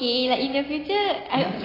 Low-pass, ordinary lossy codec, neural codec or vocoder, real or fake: 5.4 kHz; AAC, 24 kbps; none; real